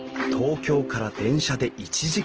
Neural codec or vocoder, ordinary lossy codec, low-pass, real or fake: none; Opus, 16 kbps; 7.2 kHz; real